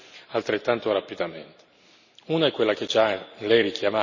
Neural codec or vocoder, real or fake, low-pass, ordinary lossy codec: none; real; 7.2 kHz; none